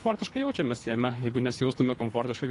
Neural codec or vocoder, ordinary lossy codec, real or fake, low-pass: codec, 24 kHz, 3 kbps, HILCodec; AAC, 48 kbps; fake; 10.8 kHz